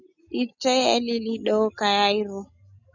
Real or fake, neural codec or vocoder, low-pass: real; none; 7.2 kHz